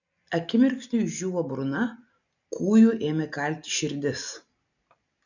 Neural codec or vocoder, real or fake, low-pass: none; real; 7.2 kHz